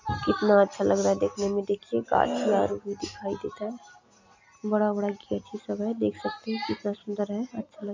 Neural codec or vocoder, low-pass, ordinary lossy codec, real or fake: none; 7.2 kHz; MP3, 48 kbps; real